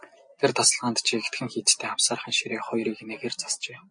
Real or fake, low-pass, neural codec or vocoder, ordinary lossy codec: real; 9.9 kHz; none; MP3, 48 kbps